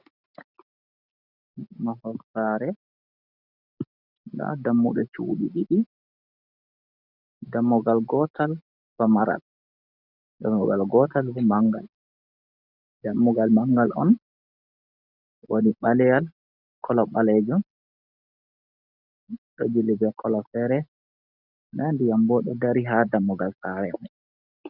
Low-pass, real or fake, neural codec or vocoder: 5.4 kHz; real; none